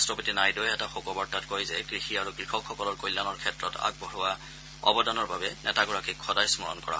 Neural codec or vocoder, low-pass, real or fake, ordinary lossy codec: none; none; real; none